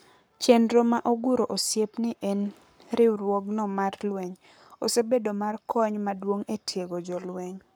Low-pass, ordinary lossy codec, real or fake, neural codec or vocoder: none; none; fake; codec, 44.1 kHz, 7.8 kbps, Pupu-Codec